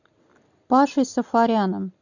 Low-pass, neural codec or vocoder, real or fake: 7.2 kHz; none; real